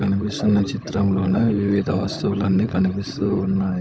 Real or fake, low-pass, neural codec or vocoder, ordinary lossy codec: fake; none; codec, 16 kHz, 16 kbps, FunCodec, trained on LibriTTS, 50 frames a second; none